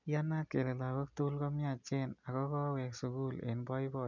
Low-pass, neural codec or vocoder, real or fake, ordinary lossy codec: 7.2 kHz; none; real; none